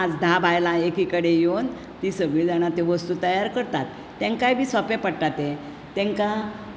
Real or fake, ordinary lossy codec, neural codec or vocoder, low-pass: real; none; none; none